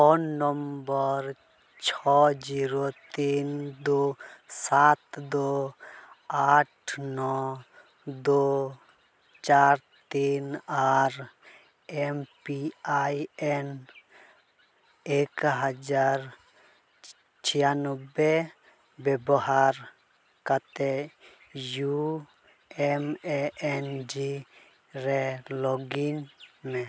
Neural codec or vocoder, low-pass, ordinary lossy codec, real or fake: none; none; none; real